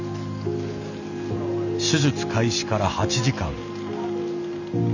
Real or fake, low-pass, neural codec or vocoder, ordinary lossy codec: real; 7.2 kHz; none; none